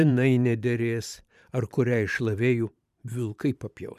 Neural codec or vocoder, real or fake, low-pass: vocoder, 44.1 kHz, 128 mel bands every 512 samples, BigVGAN v2; fake; 14.4 kHz